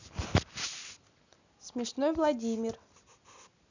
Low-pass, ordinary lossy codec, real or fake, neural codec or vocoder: 7.2 kHz; none; real; none